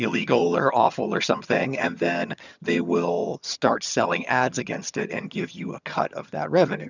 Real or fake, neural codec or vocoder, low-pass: fake; vocoder, 22.05 kHz, 80 mel bands, HiFi-GAN; 7.2 kHz